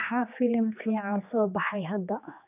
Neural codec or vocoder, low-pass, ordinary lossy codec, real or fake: codec, 16 kHz, 2 kbps, X-Codec, HuBERT features, trained on general audio; 3.6 kHz; none; fake